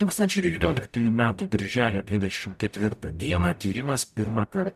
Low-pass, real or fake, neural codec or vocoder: 14.4 kHz; fake; codec, 44.1 kHz, 0.9 kbps, DAC